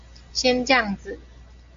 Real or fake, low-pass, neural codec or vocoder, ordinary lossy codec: real; 7.2 kHz; none; MP3, 48 kbps